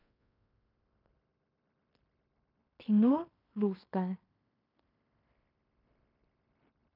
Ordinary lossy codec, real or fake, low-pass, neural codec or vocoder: none; fake; 5.4 kHz; codec, 16 kHz in and 24 kHz out, 0.9 kbps, LongCat-Audio-Codec, fine tuned four codebook decoder